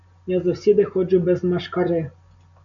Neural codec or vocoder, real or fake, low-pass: none; real; 7.2 kHz